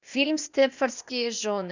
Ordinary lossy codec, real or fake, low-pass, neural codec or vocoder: Opus, 64 kbps; fake; 7.2 kHz; codec, 16 kHz, 0.8 kbps, ZipCodec